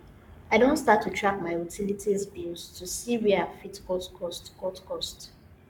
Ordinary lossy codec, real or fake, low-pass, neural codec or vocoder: none; fake; 19.8 kHz; codec, 44.1 kHz, 7.8 kbps, Pupu-Codec